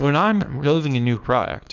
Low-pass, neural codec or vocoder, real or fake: 7.2 kHz; codec, 24 kHz, 0.9 kbps, WavTokenizer, small release; fake